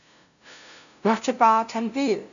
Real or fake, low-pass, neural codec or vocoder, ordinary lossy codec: fake; 7.2 kHz; codec, 16 kHz, 0.5 kbps, FunCodec, trained on LibriTTS, 25 frames a second; MP3, 96 kbps